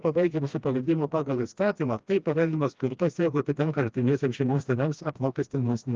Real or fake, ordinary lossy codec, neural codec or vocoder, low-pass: fake; Opus, 24 kbps; codec, 16 kHz, 1 kbps, FreqCodec, smaller model; 7.2 kHz